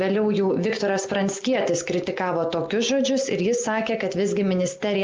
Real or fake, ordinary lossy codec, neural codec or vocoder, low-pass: real; Opus, 32 kbps; none; 7.2 kHz